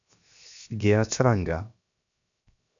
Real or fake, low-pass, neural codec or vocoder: fake; 7.2 kHz; codec, 16 kHz, 0.7 kbps, FocalCodec